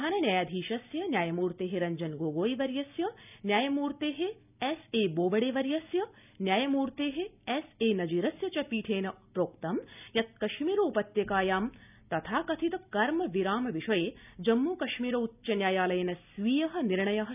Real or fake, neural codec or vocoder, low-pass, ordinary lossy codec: real; none; 3.6 kHz; none